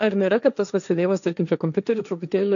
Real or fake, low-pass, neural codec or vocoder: fake; 7.2 kHz; codec, 16 kHz, 1.1 kbps, Voila-Tokenizer